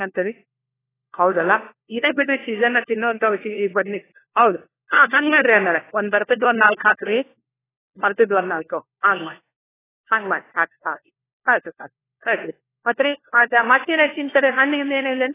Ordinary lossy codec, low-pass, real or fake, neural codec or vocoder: AAC, 16 kbps; 3.6 kHz; fake; codec, 16 kHz, 1 kbps, FunCodec, trained on LibriTTS, 50 frames a second